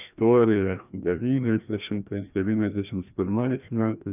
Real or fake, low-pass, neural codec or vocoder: fake; 3.6 kHz; codec, 16 kHz, 1 kbps, FreqCodec, larger model